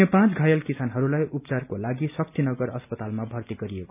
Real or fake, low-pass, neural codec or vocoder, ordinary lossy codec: real; 3.6 kHz; none; none